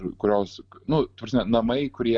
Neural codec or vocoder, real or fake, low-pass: none; real; 9.9 kHz